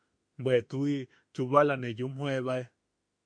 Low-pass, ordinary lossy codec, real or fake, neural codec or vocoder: 9.9 kHz; MP3, 48 kbps; fake; autoencoder, 48 kHz, 32 numbers a frame, DAC-VAE, trained on Japanese speech